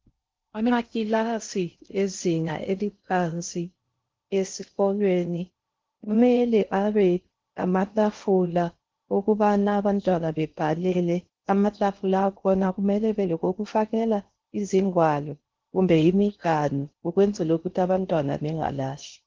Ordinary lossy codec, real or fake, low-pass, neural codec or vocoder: Opus, 16 kbps; fake; 7.2 kHz; codec, 16 kHz in and 24 kHz out, 0.6 kbps, FocalCodec, streaming, 4096 codes